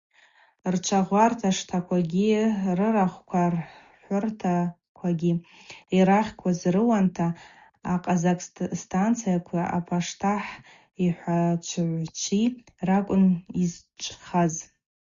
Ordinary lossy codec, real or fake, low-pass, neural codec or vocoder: Opus, 64 kbps; real; 7.2 kHz; none